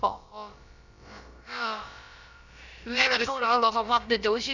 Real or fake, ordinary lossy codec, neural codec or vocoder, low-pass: fake; none; codec, 16 kHz, about 1 kbps, DyCAST, with the encoder's durations; 7.2 kHz